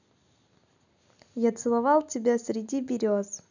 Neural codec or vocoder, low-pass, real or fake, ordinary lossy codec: none; 7.2 kHz; real; none